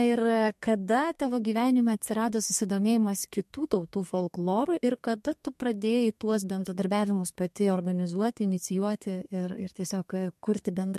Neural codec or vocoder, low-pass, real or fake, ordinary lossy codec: codec, 32 kHz, 1.9 kbps, SNAC; 14.4 kHz; fake; MP3, 64 kbps